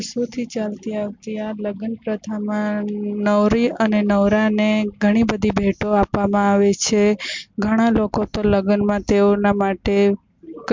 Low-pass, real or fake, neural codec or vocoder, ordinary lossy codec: 7.2 kHz; real; none; MP3, 64 kbps